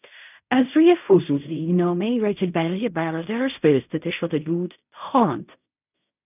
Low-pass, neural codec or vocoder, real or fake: 3.6 kHz; codec, 16 kHz in and 24 kHz out, 0.4 kbps, LongCat-Audio-Codec, fine tuned four codebook decoder; fake